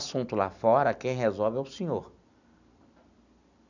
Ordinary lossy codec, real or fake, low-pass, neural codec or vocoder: none; real; 7.2 kHz; none